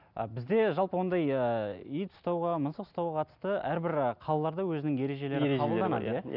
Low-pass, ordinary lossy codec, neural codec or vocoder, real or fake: 5.4 kHz; none; none; real